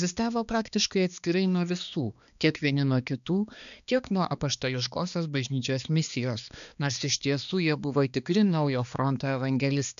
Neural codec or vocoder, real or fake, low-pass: codec, 16 kHz, 2 kbps, X-Codec, HuBERT features, trained on balanced general audio; fake; 7.2 kHz